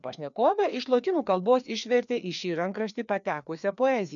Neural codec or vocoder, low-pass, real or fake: codec, 16 kHz, 2 kbps, FreqCodec, larger model; 7.2 kHz; fake